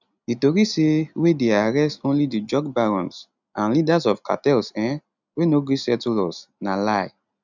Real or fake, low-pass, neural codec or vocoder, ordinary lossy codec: real; 7.2 kHz; none; none